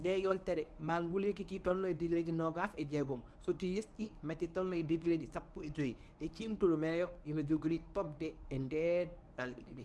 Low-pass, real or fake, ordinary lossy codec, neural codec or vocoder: none; fake; none; codec, 24 kHz, 0.9 kbps, WavTokenizer, medium speech release version 1